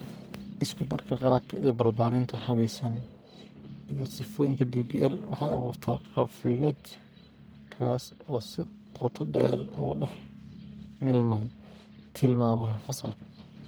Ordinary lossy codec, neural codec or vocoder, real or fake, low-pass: none; codec, 44.1 kHz, 1.7 kbps, Pupu-Codec; fake; none